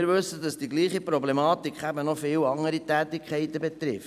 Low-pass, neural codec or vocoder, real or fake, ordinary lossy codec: 14.4 kHz; vocoder, 44.1 kHz, 128 mel bands every 256 samples, BigVGAN v2; fake; none